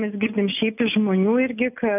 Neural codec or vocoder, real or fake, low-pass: none; real; 3.6 kHz